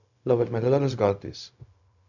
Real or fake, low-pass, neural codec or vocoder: fake; 7.2 kHz; codec, 16 kHz, 0.4 kbps, LongCat-Audio-Codec